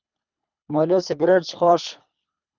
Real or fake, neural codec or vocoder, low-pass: fake; codec, 24 kHz, 3 kbps, HILCodec; 7.2 kHz